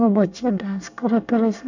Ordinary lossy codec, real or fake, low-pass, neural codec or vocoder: none; fake; 7.2 kHz; codec, 24 kHz, 1 kbps, SNAC